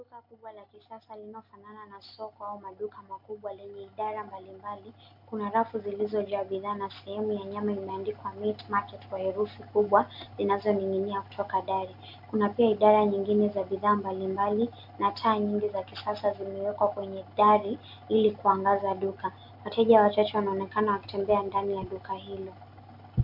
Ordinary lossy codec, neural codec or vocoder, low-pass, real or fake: MP3, 48 kbps; none; 5.4 kHz; real